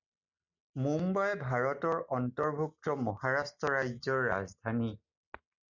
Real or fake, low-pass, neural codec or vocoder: real; 7.2 kHz; none